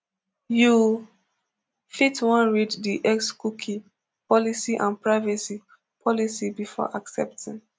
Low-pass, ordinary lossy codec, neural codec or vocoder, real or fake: none; none; none; real